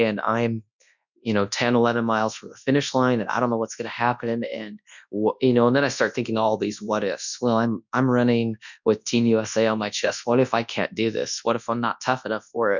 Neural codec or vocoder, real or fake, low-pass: codec, 24 kHz, 0.9 kbps, WavTokenizer, large speech release; fake; 7.2 kHz